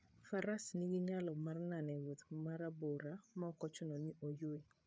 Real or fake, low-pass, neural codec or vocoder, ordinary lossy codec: fake; none; codec, 16 kHz, 8 kbps, FreqCodec, larger model; none